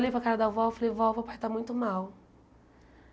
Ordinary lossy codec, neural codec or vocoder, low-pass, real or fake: none; none; none; real